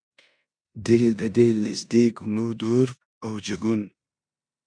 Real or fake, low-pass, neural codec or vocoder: fake; 9.9 kHz; codec, 16 kHz in and 24 kHz out, 0.9 kbps, LongCat-Audio-Codec, four codebook decoder